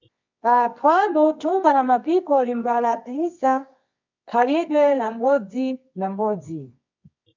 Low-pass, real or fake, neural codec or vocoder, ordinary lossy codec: 7.2 kHz; fake; codec, 24 kHz, 0.9 kbps, WavTokenizer, medium music audio release; MP3, 64 kbps